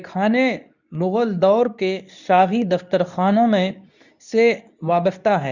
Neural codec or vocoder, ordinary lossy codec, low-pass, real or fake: codec, 24 kHz, 0.9 kbps, WavTokenizer, medium speech release version 2; none; 7.2 kHz; fake